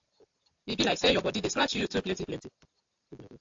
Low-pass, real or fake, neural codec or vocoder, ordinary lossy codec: 7.2 kHz; real; none; AAC, 48 kbps